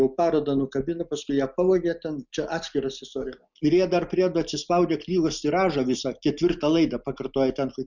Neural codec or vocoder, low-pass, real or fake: none; 7.2 kHz; real